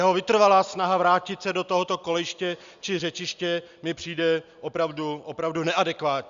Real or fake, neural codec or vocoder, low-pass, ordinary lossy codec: real; none; 7.2 kHz; Opus, 64 kbps